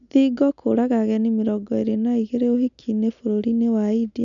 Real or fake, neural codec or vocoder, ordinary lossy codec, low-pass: real; none; none; 7.2 kHz